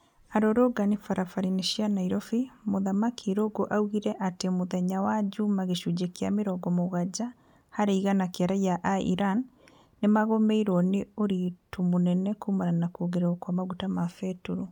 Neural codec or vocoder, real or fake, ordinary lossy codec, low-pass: none; real; none; 19.8 kHz